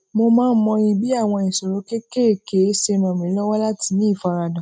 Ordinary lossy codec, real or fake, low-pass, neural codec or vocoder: none; real; none; none